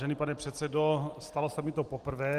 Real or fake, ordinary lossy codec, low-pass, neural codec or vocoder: real; Opus, 24 kbps; 14.4 kHz; none